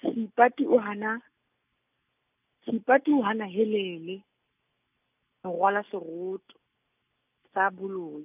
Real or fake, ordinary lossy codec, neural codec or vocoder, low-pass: real; none; none; 3.6 kHz